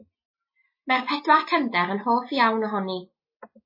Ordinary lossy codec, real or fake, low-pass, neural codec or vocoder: MP3, 24 kbps; real; 5.4 kHz; none